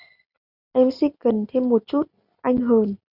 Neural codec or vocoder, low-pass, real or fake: none; 5.4 kHz; real